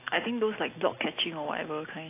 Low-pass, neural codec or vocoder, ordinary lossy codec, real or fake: 3.6 kHz; none; AAC, 24 kbps; real